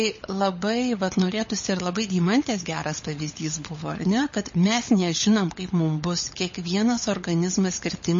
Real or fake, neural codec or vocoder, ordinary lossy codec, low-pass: fake; codec, 16 kHz, 16 kbps, FunCodec, trained on LibriTTS, 50 frames a second; MP3, 32 kbps; 7.2 kHz